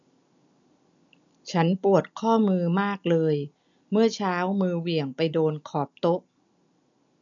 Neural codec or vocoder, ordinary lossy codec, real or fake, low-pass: none; none; real; 7.2 kHz